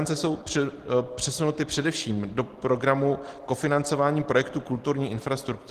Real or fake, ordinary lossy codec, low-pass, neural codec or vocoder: real; Opus, 16 kbps; 14.4 kHz; none